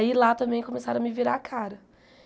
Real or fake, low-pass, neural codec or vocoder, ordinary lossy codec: real; none; none; none